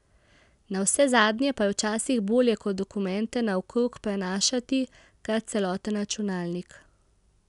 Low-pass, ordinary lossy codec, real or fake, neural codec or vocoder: 10.8 kHz; none; real; none